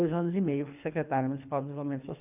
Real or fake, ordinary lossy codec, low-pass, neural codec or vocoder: fake; none; 3.6 kHz; codec, 24 kHz, 3 kbps, HILCodec